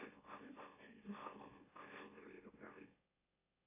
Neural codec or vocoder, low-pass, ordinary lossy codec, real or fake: autoencoder, 44.1 kHz, a latent of 192 numbers a frame, MeloTTS; 3.6 kHz; AAC, 24 kbps; fake